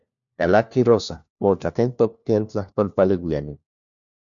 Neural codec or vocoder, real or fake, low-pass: codec, 16 kHz, 1 kbps, FunCodec, trained on LibriTTS, 50 frames a second; fake; 7.2 kHz